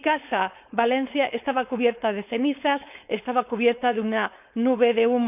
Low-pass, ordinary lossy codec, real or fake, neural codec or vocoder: 3.6 kHz; none; fake; codec, 16 kHz, 4.8 kbps, FACodec